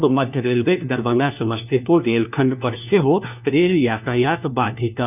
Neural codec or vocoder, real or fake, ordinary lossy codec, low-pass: codec, 16 kHz, 1 kbps, FunCodec, trained on LibriTTS, 50 frames a second; fake; none; 3.6 kHz